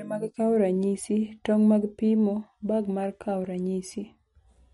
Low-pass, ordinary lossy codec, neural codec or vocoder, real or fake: 19.8 kHz; MP3, 48 kbps; none; real